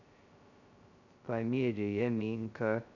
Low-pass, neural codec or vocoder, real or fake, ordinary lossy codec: 7.2 kHz; codec, 16 kHz, 0.2 kbps, FocalCodec; fake; none